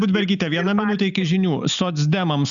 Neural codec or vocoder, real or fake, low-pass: none; real; 7.2 kHz